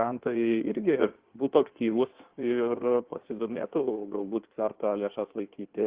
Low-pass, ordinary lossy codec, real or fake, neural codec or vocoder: 3.6 kHz; Opus, 16 kbps; fake; codec, 16 kHz in and 24 kHz out, 1.1 kbps, FireRedTTS-2 codec